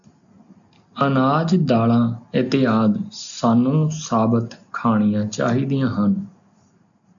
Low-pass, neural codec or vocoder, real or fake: 7.2 kHz; none; real